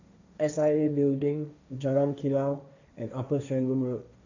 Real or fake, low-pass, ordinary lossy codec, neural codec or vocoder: fake; 7.2 kHz; none; codec, 16 kHz, 1.1 kbps, Voila-Tokenizer